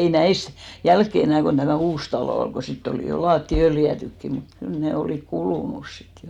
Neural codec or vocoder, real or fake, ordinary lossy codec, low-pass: none; real; none; 19.8 kHz